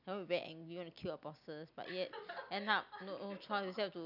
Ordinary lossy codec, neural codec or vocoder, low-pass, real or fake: none; none; 5.4 kHz; real